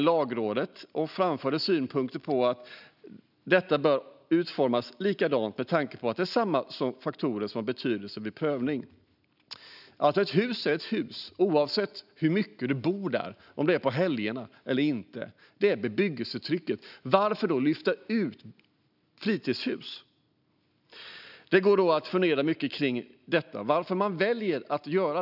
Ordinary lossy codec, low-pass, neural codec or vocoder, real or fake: none; 5.4 kHz; none; real